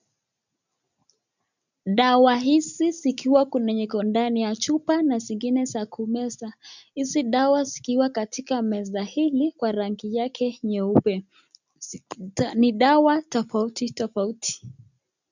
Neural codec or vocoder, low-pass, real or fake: none; 7.2 kHz; real